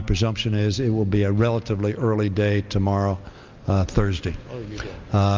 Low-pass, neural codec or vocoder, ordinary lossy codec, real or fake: 7.2 kHz; none; Opus, 24 kbps; real